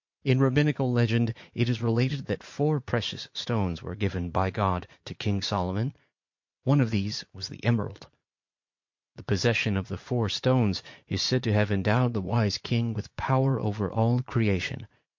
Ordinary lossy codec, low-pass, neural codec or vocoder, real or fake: MP3, 48 kbps; 7.2 kHz; vocoder, 44.1 kHz, 80 mel bands, Vocos; fake